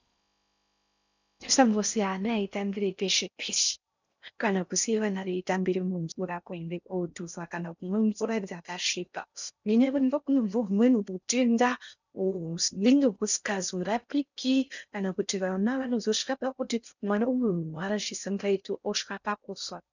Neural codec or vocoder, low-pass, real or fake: codec, 16 kHz in and 24 kHz out, 0.6 kbps, FocalCodec, streaming, 4096 codes; 7.2 kHz; fake